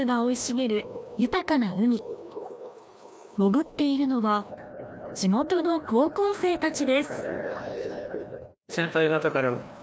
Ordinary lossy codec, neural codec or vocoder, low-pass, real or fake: none; codec, 16 kHz, 1 kbps, FreqCodec, larger model; none; fake